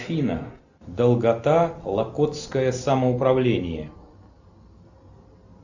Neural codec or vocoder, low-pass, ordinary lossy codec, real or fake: none; 7.2 kHz; Opus, 64 kbps; real